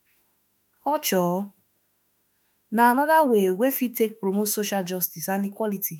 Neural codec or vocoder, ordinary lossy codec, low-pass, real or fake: autoencoder, 48 kHz, 32 numbers a frame, DAC-VAE, trained on Japanese speech; none; none; fake